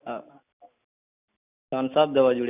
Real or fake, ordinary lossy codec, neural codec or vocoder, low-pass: real; none; none; 3.6 kHz